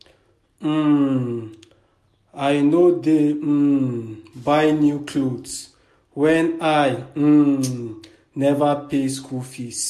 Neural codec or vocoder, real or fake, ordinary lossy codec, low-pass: none; real; MP3, 64 kbps; 14.4 kHz